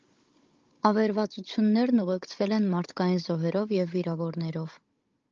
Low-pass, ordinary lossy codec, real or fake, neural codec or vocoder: 7.2 kHz; Opus, 24 kbps; fake; codec, 16 kHz, 16 kbps, FunCodec, trained on Chinese and English, 50 frames a second